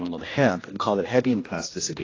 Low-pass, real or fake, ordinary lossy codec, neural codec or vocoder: 7.2 kHz; fake; AAC, 32 kbps; codec, 16 kHz, 1 kbps, X-Codec, HuBERT features, trained on general audio